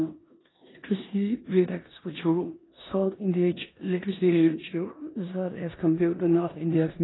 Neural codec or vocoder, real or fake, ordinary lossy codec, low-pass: codec, 16 kHz in and 24 kHz out, 0.9 kbps, LongCat-Audio-Codec, four codebook decoder; fake; AAC, 16 kbps; 7.2 kHz